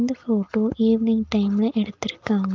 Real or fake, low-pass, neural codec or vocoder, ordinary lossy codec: real; 7.2 kHz; none; Opus, 24 kbps